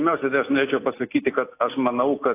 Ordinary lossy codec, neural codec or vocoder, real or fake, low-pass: AAC, 24 kbps; none; real; 3.6 kHz